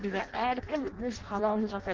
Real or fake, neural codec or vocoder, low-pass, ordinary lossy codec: fake; codec, 16 kHz in and 24 kHz out, 0.6 kbps, FireRedTTS-2 codec; 7.2 kHz; Opus, 16 kbps